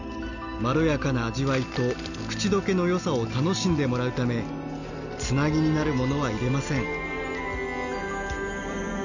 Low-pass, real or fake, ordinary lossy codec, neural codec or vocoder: 7.2 kHz; real; none; none